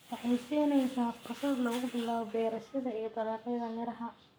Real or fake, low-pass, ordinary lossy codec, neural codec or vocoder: fake; none; none; codec, 44.1 kHz, 7.8 kbps, Pupu-Codec